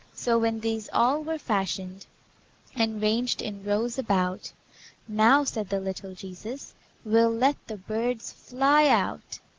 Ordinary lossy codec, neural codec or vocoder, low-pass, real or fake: Opus, 16 kbps; none; 7.2 kHz; real